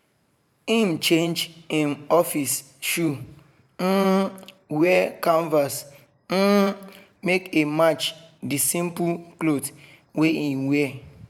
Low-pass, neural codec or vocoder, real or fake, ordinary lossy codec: 19.8 kHz; vocoder, 44.1 kHz, 128 mel bands every 512 samples, BigVGAN v2; fake; none